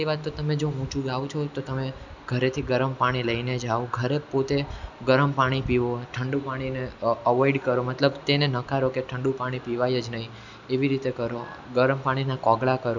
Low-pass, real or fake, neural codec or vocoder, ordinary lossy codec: 7.2 kHz; real; none; none